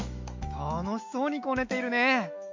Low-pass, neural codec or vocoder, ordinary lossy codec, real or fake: 7.2 kHz; autoencoder, 48 kHz, 128 numbers a frame, DAC-VAE, trained on Japanese speech; MP3, 64 kbps; fake